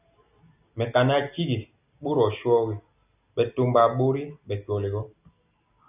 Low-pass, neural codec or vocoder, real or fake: 3.6 kHz; none; real